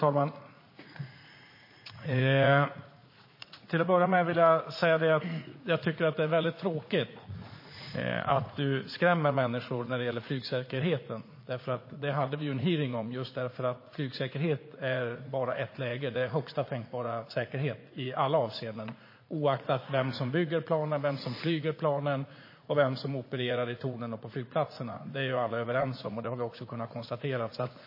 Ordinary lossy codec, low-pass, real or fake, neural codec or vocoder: MP3, 24 kbps; 5.4 kHz; fake; vocoder, 44.1 kHz, 80 mel bands, Vocos